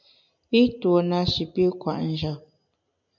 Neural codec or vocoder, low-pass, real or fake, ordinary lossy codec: none; 7.2 kHz; real; MP3, 64 kbps